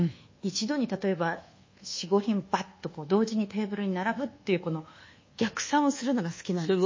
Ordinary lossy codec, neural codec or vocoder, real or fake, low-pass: MP3, 32 kbps; codec, 24 kHz, 1.2 kbps, DualCodec; fake; 7.2 kHz